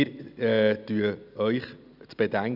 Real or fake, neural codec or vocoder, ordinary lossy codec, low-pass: real; none; none; 5.4 kHz